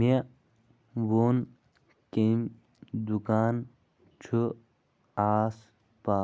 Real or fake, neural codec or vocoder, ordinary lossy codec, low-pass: real; none; none; none